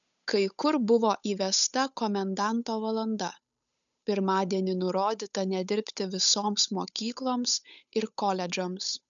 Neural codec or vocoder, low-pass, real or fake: codec, 16 kHz, 8 kbps, FunCodec, trained on Chinese and English, 25 frames a second; 7.2 kHz; fake